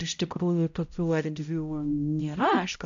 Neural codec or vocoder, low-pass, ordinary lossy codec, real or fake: codec, 16 kHz, 0.5 kbps, X-Codec, HuBERT features, trained on balanced general audio; 7.2 kHz; AAC, 64 kbps; fake